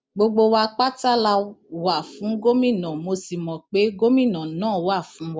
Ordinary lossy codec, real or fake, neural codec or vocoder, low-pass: none; real; none; none